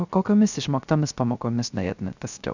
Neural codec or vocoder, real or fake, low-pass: codec, 16 kHz, 0.3 kbps, FocalCodec; fake; 7.2 kHz